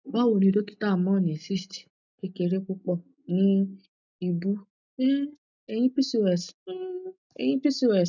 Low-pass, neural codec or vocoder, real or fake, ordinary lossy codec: 7.2 kHz; none; real; none